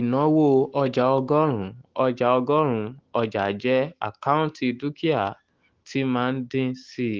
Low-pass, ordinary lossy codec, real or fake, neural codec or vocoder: 7.2 kHz; Opus, 16 kbps; fake; autoencoder, 48 kHz, 128 numbers a frame, DAC-VAE, trained on Japanese speech